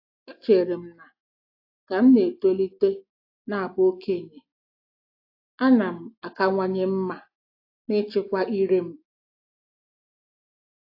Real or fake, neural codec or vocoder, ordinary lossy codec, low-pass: real; none; AAC, 32 kbps; 5.4 kHz